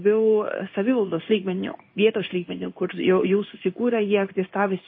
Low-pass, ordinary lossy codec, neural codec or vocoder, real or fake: 5.4 kHz; MP3, 24 kbps; codec, 16 kHz in and 24 kHz out, 1 kbps, XY-Tokenizer; fake